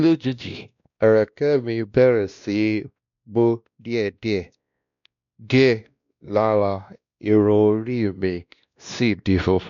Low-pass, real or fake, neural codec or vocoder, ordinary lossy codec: 7.2 kHz; fake; codec, 16 kHz, 1 kbps, X-Codec, WavLM features, trained on Multilingual LibriSpeech; none